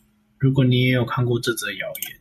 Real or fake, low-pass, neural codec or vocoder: real; 14.4 kHz; none